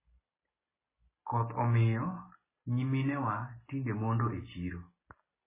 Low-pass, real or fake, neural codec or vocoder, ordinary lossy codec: 3.6 kHz; real; none; MP3, 16 kbps